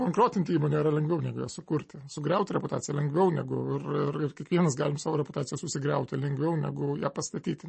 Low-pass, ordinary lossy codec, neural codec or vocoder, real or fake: 10.8 kHz; MP3, 32 kbps; none; real